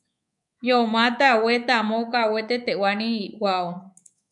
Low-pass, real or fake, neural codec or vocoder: 10.8 kHz; fake; codec, 24 kHz, 3.1 kbps, DualCodec